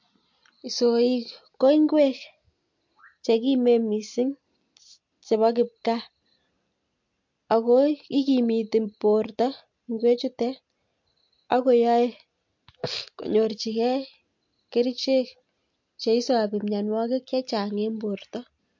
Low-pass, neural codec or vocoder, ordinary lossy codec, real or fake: 7.2 kHz; none; MP3, 48 kbps; real